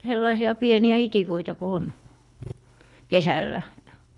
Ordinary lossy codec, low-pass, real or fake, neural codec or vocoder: none; 10.8 kHz; fake; codec, 24 kHz, 3 kbps, HILCodec